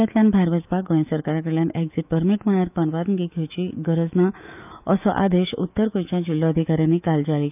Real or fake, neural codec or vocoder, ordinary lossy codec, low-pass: fake; autoencoder, 48 kHz, 128 numbers a frame, DAC-VAE, trained on Japanese speech; none; 3.6 kHz